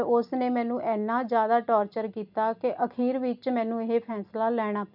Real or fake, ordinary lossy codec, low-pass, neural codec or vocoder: real; none; 5.4 kHz; none